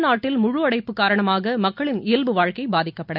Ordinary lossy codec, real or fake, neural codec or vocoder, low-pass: none; real; none; 5.4 kHz